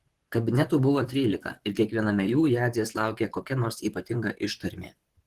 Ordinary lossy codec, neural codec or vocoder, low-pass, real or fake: Opus, 16 kbps; vocoder, 44.1 kHz, 128 mel bands, Pupu-Vocoder; 14.4 kHz; fake